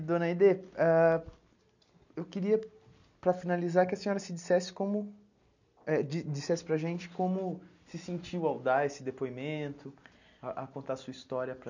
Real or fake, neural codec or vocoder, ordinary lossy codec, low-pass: real; none; none; 7.2 kHz